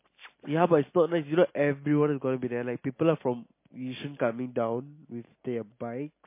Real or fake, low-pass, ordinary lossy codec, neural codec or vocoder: real; 3.6 kHz; MP3, 24 kbps; none